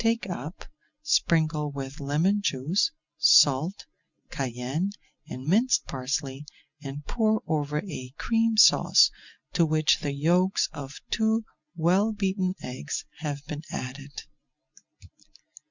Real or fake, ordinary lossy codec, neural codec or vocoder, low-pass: real; Opus, 64 kbps; none; 7.2 kHz